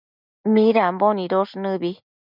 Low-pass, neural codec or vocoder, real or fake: 5.4 kHz; none; real